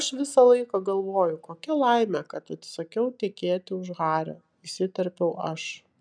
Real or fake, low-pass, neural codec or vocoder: real; 9.9 kHz; none